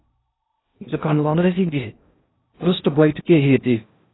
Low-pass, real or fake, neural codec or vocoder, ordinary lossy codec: 7.2 kHz; fake; codec, 16 kHz in and 24 kHz out, 0.6 kbps, FocalCodec, streaming, 4096 codes; AAC, 16 kbps